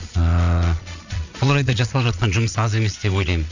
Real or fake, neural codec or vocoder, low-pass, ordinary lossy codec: fake; vocoder, 22.05 kHz, 80 mel bands, Vocos; 7.2 kHz; none